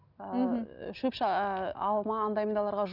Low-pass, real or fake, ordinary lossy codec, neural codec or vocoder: 5.4 kHz; real; none; none